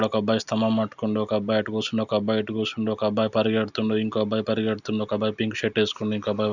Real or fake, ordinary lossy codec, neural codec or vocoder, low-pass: real; none; none; 7.2 kHz